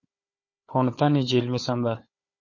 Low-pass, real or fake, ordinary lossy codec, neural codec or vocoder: 7.2 kHz; fake; MP3, 32 kbps; codec, 16 kHz, 4 kbps, FunCodec, trained on Chinese and English, 50 frames a second